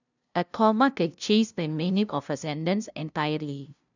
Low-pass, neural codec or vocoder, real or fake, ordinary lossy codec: 7.2 kHz; codec, 16 kHz, 0.5 kbps, FunCodec, trained on LibriTTS, 25 frames a second; fake; none